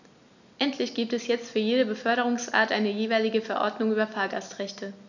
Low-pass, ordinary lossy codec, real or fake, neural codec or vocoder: 7.2 kHz; none; real; none